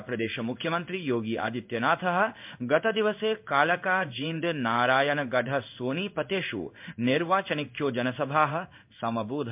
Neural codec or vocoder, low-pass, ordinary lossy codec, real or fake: codec, 16 kHz in and 24 kHz out, 1 kbps, XY-Tokenizer; 3.6 kHz; MP3, 32 kbps; fake